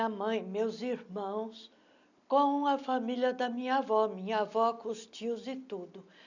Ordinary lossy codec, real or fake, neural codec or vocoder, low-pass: none; real; none; 7.2 kHz